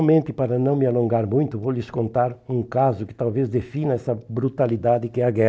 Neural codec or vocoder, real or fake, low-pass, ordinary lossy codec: none; real; none; none